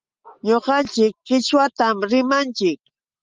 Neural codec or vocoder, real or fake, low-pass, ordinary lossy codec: none; real; 7.2 kHz; Opus, 32 kbps